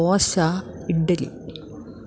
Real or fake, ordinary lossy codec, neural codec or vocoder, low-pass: real; none; none; none